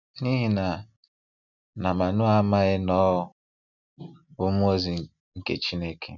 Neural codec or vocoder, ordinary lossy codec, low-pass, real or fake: none; none; 7.2 kHz; real